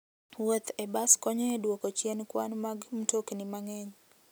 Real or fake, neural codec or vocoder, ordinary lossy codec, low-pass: real; none; none; none